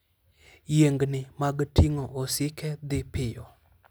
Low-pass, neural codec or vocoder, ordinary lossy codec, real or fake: none; none; none; real